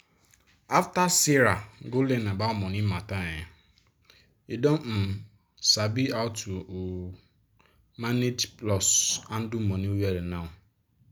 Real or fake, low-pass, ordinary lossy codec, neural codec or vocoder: real; none; none; none